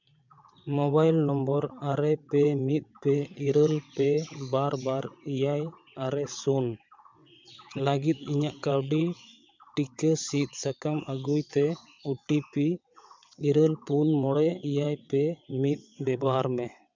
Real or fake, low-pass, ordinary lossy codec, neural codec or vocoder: fake; 7.2 kHz; MP3, 64 kbps; vocoder, 22.05 kHz, 80 mel bands, WaveNeXt